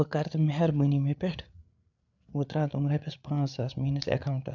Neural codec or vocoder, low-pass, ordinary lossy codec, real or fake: codec, 16 kHz, 8 kbps, FreqCodec, larger model; 7.2 kHz; none; fake